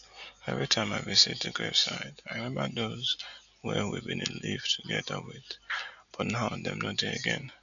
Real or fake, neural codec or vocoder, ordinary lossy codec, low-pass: real; none; none; 7.2 kHz